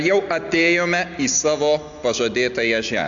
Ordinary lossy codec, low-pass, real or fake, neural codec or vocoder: AAC, 64 kbps; 7.2 kHz; real; none